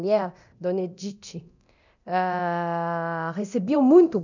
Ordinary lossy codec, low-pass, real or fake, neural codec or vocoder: none; 7.2 kHz; fake; codec, 24 kHz, 0.9 kbps, DualCodec